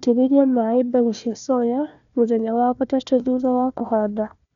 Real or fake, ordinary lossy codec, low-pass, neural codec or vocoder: fake; none; 7.2 kHz; codec, 16 kHz, 1 kbps, FunCodec, trained on Chinese and English, 50 frames a second